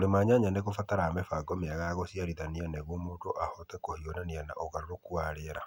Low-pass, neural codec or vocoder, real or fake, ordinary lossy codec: 19.8 kHz; none; real; none